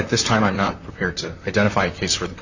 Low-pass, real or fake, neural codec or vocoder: 7.2 kHz; fake; vocoder, 44.1 kHz, 128 mel bands, Pupu-Vocoder